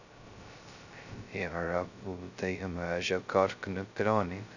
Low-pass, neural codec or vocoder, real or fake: 7.2 kHz; codec, 16 kHz, 0.2 kbps, FocalCodec; fake